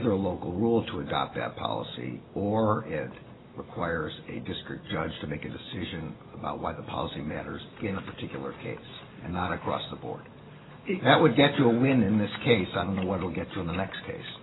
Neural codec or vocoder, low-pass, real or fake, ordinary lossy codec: none; 7.2 kHz; real; AAC, 16 kbps